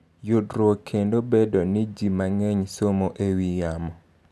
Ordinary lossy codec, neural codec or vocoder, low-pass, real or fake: none; none; none; real